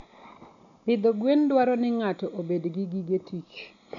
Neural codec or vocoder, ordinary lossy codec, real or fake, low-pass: none; none; real; 7.2 kHz